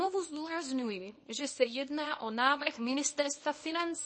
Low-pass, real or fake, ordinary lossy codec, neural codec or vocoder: 9.9 kHz; fake; MP3, 32 kbps; codec, 24 kHz, 0.9 kbps, WavTokenizer, small release